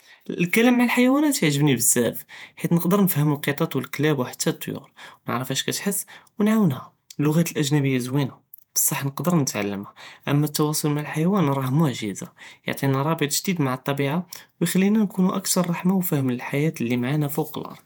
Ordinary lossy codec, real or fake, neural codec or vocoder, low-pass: none; fake; vocoder, 48 kHz, 128 mel bands, Vocos; none